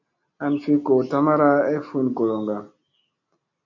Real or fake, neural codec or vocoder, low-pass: real; none; 7.2 kHz